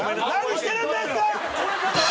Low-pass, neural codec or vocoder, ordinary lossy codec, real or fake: none; none; none; real